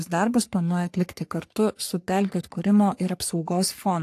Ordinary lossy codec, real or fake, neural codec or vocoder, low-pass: AAC, 64 kbps; fake; codec, 44.1 kHz, 3.4 kbps, Pupu-Codec; 14.4 kHz